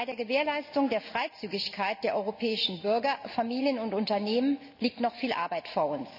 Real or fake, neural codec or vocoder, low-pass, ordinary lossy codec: real; none; 5.4 kHz; none